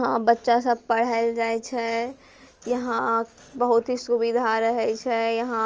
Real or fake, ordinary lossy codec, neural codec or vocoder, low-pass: real; Opus, 24 kbps; none; 7.2 kHz